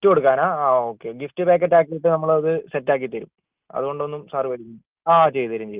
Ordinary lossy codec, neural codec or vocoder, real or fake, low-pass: Opus, 32 kbps; none; real; 3.6 kHz